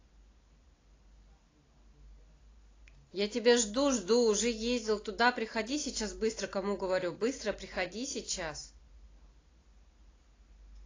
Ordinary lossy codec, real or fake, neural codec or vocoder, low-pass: AAC, 32 kbps; real; none; 7.2 kHz